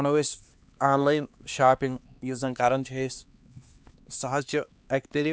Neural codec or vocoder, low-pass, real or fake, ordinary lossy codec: codec, 16 kHz, 2 kbps, X-Codec, HuBERT features, trained on LibriSpeech; none; fake; none